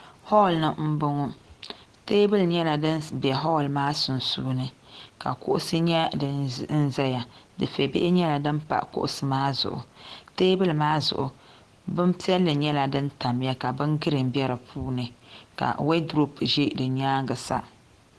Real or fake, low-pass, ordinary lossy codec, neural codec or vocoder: real; 10.8 kHz; Opus, 16 kbps; none